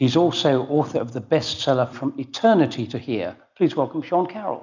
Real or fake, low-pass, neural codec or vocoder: real; 7.2 kHz; none